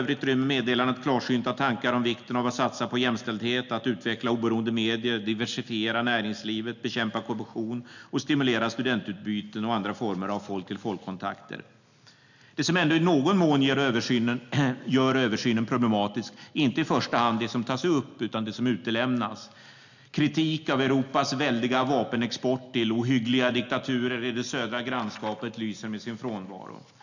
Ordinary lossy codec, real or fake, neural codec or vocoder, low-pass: none; real; none; 7.2 kHz